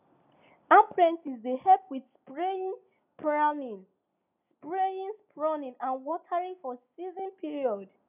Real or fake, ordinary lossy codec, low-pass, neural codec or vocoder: real; none; 3.6 kHz; none